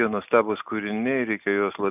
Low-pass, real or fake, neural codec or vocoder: 3.6 kHz; real; none